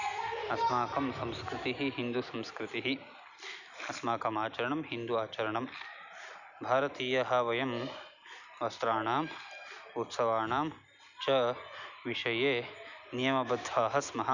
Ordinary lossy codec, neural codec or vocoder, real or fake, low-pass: none; none; real; 7.2 kHz